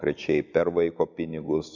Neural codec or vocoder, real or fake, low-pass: none; real; 7.2 kHz